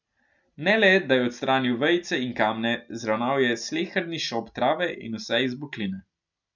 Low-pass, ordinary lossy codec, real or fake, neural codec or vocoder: 7.2 kHz; none; real; none